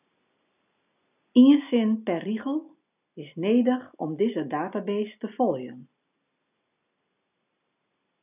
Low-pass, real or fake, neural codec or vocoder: 3.6 kHz; real; none